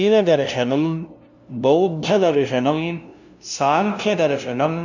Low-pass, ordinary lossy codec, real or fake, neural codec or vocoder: 7.2 kHz; none; fake; codec, 16 kHz, 0.5 kbps, FunCodec, trained on LibriTTS, 25 frames a second